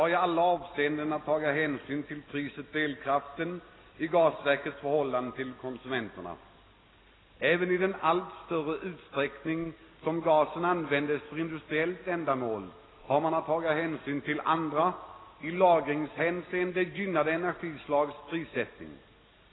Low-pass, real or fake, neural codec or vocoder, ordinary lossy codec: 7.2 kHz; real; none; AAC, 16 kbps